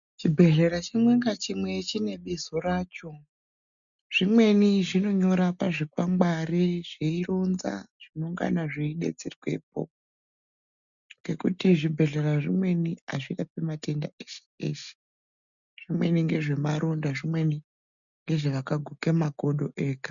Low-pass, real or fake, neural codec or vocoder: 7.2 kHz; real; none